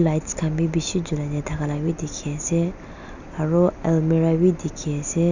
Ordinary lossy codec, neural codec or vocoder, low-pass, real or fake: none; none; 7.2 kHz; real